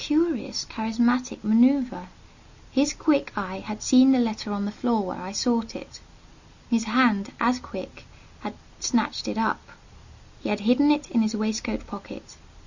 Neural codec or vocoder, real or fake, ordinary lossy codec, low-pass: none; real; Opus, 64 kbps; 7.2 kHz